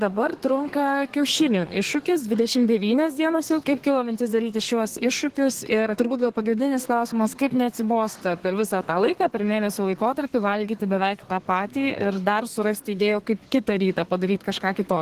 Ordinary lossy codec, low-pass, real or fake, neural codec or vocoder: Opus, 16 kbps; 14.4 kHz; fake; codec, 32 kHz, 1.9 kbps, SNAC